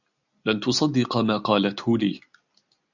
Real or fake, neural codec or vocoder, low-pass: real; none; 7.2 kHz